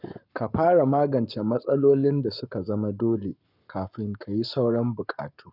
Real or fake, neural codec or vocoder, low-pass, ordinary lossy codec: fake; codec, 44.1 kHz, 7.8 kbps, Pupu-Codec; 5.4 kHz; none